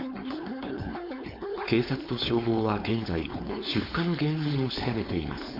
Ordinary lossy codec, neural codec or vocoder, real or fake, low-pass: MP3, 32 kbps; codec, 16 kHz, 4.8 kbps, FACodec; fake; 5.4 kHz